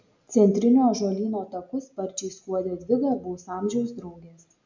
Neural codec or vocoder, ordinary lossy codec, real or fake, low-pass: none; MP3, 64 kbps; real; 7.2 kHz